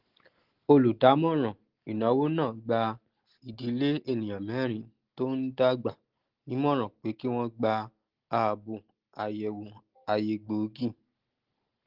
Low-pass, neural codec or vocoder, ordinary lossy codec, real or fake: 5.4 kHz; none; Opus, 16 kbps; real